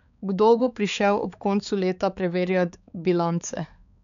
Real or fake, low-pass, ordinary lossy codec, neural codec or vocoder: fake; 7.2 kHz; none; codec, 16 kHz, 4 kbps, X-Codec, HuBERT features, trained on balanced general audio